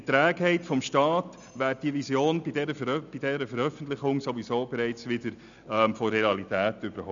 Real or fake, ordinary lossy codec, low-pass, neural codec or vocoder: real; none; 7.2 kHz; none